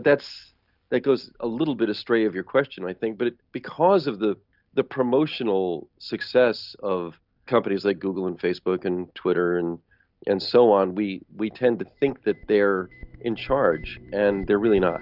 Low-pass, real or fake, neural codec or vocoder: 5.4 kHz; real; none